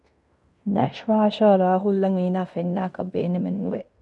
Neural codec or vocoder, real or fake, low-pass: codec, 16 kHz in and 24 kHz out, 0.9 kbps, LongCat-Audio-Codec, fine tuned four codebook decoder; fake; 10.8 kHz